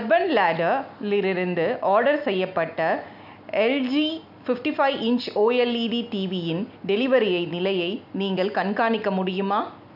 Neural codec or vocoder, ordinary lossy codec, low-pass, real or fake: none; none; 5.4 kHz; real